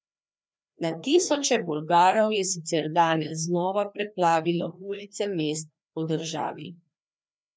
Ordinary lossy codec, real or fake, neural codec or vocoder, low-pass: none; fake; codec, 16 kHz, 2 kbps, FreqCodec, larger model; none